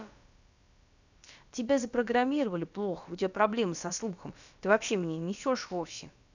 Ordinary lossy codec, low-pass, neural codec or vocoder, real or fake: none; 7.2 kHz; codec, 16 kHz, about 1 kbps, DyCAST, with the encoder's durations; fake